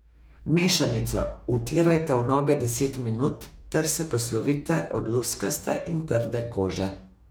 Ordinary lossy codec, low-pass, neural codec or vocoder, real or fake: none; none; codec, 44.1 kHz, 2.6 kbps, DAC; fake